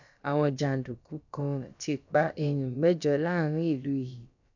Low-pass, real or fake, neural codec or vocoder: 7.2 kHz; fake; codec, 16 kHz, about 1 kbps, DyCAST, with the encoder's durations